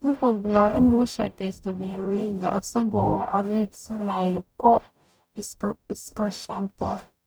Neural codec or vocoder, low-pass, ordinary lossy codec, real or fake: codec, 44.1 kHz, 0.9 kbps, DAC; none; none; fake